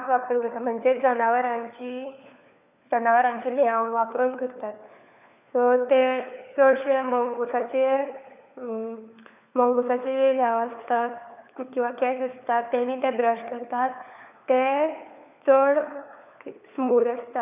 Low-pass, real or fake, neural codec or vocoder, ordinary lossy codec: 3.6 kHz; fake; codec, 16 kHz, 4 kbps, FunCodec, trained on LibriTTS, 50 frames a second; none